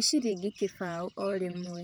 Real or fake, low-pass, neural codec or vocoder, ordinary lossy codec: fake; none; vocoder, 44.1 kHz, 128 mel bands, Pupu-Vocoder; none